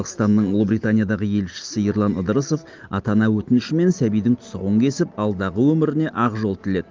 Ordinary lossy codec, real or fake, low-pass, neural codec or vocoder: Opus, 32 kbps; real; 7.2 kHz; none